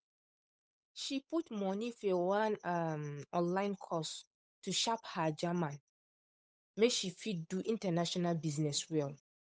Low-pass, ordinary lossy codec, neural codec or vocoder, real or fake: none; none; codec, 16 kHz, 8 kbps, FunCodec, trained on Chinese and English, 25 frames a second; fake